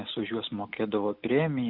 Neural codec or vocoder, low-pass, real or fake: none; 5.4 kHz; real